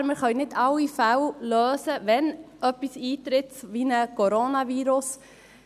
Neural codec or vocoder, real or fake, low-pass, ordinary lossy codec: none; real; 14.4 kHz; none